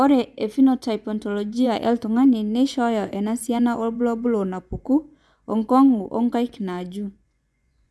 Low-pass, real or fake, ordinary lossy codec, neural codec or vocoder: none; fake; none; vocoder, 24 kHz, 100 mel bands, Vocos